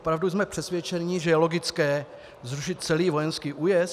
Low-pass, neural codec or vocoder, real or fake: 14.4 kHz; none; real